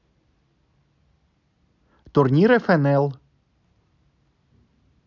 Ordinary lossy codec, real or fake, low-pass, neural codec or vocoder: none; real; 7.2 kHz; none